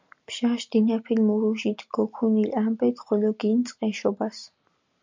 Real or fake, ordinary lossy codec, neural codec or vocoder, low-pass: real; MP3, 64 kbps; none; 7.2 kHz